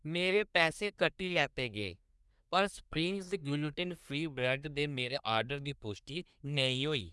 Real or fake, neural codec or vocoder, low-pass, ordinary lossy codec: fake; codec, 24 kHz, 1 kbps, SNAC; none; none